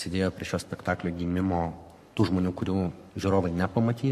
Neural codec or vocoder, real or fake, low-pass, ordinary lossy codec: codec, 44.1 kHz, 7.8 kbps, Pupu-Codec; fake; 14.4 kHz; MP3, 64 kbps